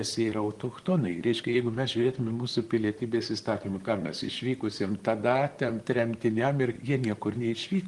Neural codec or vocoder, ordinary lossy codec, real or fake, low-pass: vocoder, 44.1 kHz, 128 mel bands, Pupu-Vocoder; Opus, 24 kbps; fake; 10.8 kHz